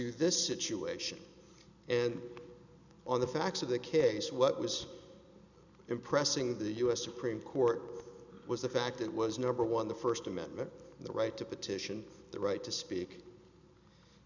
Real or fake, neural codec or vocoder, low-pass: real; none; 7.2 kHz